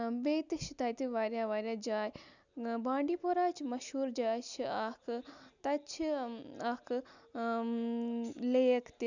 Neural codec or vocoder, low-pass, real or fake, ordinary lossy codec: none; 7.2 kHz; real; none